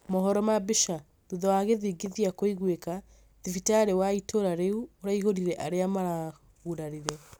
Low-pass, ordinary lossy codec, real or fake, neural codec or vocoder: none; none; real; none